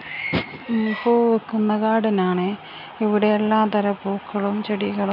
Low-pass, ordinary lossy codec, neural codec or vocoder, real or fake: 5.4 kHz; none; none; real